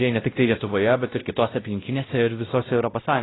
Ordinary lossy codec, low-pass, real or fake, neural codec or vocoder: AAC, 16 kbps; 7.2 kHz; fake; codec, 16 kHz, 0.5 kbps, X-Codec, WavLM features, trained on Multilingual LibriSpeech